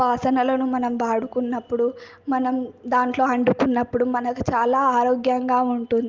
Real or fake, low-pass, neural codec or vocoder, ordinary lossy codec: real; 7.2 kHz; none; Opus, 24 kbps